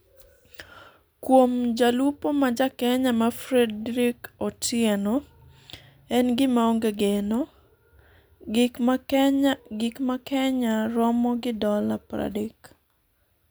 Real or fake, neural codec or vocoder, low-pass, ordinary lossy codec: real; none; none; none